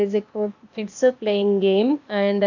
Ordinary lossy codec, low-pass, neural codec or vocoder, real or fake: none; 7.2 kHz; codec, 16 kHz, 0.8 kbps, ZipCodec; fake